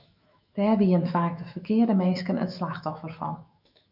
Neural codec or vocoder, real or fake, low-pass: autoencoder, 48 kHz, 128 numbers a frame, DAC-VAE, trained on Japanese speech; fake; 5.4 kHz